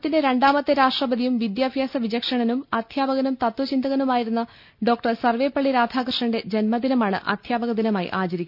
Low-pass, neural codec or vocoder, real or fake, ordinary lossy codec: 5.4 kHz; none; real; none